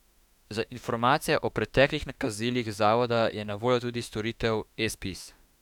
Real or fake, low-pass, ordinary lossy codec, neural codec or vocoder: fake; 19.8 kHz; none; autoencoder, 48 kHz, 32 numbers a frame, DAC-VAE, trained on Japanese speech